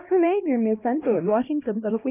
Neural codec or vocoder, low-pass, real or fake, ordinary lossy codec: codec, 16 kHz, 1 kbps, X-Codec, HuBERT features, trained on LibriSpeech; 3.6 kHz; fake; none